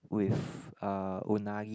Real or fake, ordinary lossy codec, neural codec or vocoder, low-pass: real; none; none; none